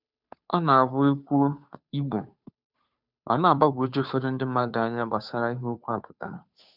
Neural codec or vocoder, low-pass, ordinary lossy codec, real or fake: codec, 16 kHz, 2 kbps, FunCodec, trained on Chinese and English, 25 frames a second; 5.4 kHz; none; fake